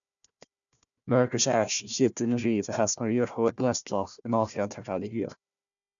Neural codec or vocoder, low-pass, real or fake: codec, 16 kHz, 1 kbps, FunCodec, trained on Chinese and English, 50 frames a second; 7.2 kHz; fake